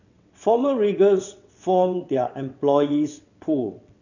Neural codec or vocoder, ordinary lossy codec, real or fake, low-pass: vocoder, 22.05 kHz, 80 mel bands, WaveNeXt; none; fake; 7.2 kHz